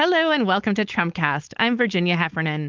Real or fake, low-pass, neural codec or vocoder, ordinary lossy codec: fake; 7.2 kHz; codec, 16 kHz, 4.8 kbps, FACodec; Opus, 32 kbps